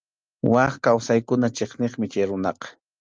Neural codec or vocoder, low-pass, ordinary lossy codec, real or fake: none; 7.2 kHz; Opus, 24 kbps; real